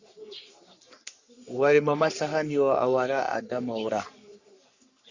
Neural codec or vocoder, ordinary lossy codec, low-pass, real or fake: codec, 44.1 kHz, 3.4 kbps, Pupu-Codec; Opus, 64 kbps; 7.2 kHz; fake